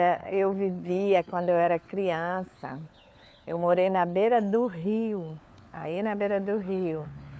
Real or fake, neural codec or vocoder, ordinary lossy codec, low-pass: fake; codec, 16 kHz, 4 kbps, FunCodec, trained on LibriTTS, 50 frames a second; none; none